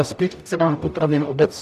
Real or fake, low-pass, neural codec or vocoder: fake; 14.4 kHz; codec, 44.1 kHz, 0.9 kbps, DAC